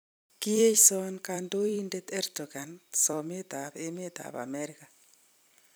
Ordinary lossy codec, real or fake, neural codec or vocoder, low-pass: none; fake; vocoder, 44.1 kHz, 128 mel bands every 256 samples, BigVGAN v2; none